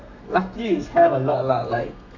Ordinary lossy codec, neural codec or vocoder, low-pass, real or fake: none; codec, 32 kHz, 1.9 kbps, SNAC; 7.2 kHz; fake